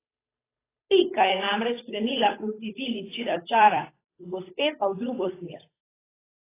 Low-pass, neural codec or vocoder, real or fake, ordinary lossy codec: 3.6 kHz; codec, 16 kHz, 8 kbps, FunCodec, trained on Chinese and English, 25 frames a second; fake; AAC, 16 kbps